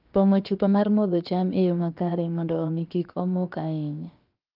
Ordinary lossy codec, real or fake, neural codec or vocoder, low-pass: Opus, 32 kbps; fake; codec, 16 kHz, about 1 kbps, DyCAST, with the encoder's durations; 5.4 kHz